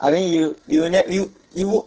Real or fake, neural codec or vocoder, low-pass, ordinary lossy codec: fake; codec, 44.1 kHz, 2.6 kbps, SNAC; 7.2 kHz; Opus, 16 kbps